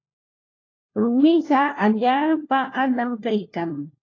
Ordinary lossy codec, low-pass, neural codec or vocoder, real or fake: AAC, 32 kbps; 7.2 kHz; codec, 16 kHz, 1 kbps, FunCodec, trained on LibriTTS, 50 frames a second; fake